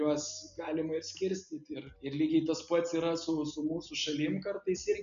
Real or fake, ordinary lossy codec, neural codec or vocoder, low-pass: real; AAC, 96 kbps; none; 7.2 kHz